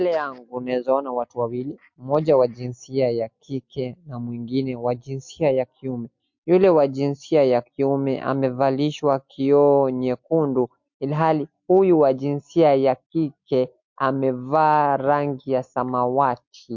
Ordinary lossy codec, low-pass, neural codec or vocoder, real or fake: MP3, 48 kbps; 7.2 kHz; none; real